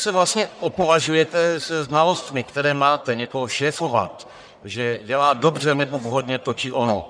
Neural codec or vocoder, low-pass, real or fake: codec, 44.1 kHz, 1.7 kbps, Pupu-Codec; 9.9 kHz; fake